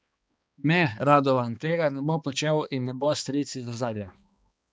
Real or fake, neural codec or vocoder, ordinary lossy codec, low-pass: fake; codec, 16 kHz, 2 kbps, X-Codec, HuBERT features, trained on balanced general audio; none; none